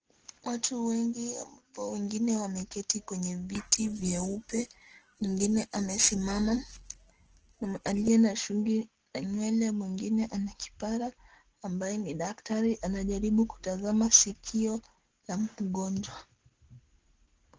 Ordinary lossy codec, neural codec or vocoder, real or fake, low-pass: Opus, 16 kbps; none; real; 7.2 kHz